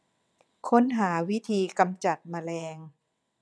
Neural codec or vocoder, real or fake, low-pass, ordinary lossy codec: vocoder, 22.05 kHz, 80 mel bands, WaveNeXt; fake; none; none